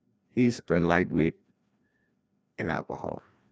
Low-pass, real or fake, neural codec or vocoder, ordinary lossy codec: none; fake; codec, 16 kHz, 1 kbps, FreqCodec, larger model; none